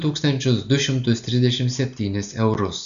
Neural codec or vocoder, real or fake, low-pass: none; real; 7.2 kHz